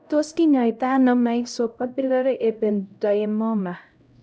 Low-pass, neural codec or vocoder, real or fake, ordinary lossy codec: none; codec, 16 kHz, 0.5 kbps, X-Codec, HuBERT features, trained on LibriSpeech; fake; none